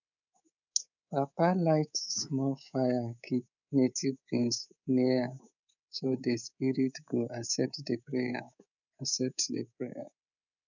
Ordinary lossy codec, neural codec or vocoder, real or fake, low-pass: none; codec, 24 kHz, 3.1 kbps, DualCodec; fake; 7.2 kHz